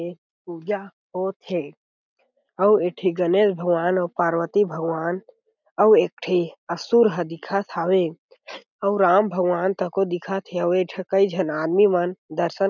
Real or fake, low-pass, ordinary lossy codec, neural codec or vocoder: real; 7.2 kHz; none; none